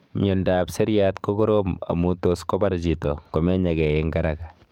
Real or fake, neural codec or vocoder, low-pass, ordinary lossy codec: fake; codec, 44.1 kHz, 7.8 kbps, DAC; 19.8 kHz; MP3, 96 kbps